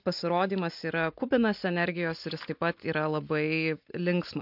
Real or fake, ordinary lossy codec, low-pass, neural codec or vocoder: real; MP3, 48 kbps; 5.4 kHz; none